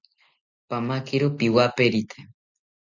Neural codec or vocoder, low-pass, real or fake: none; 7.2 kHz; real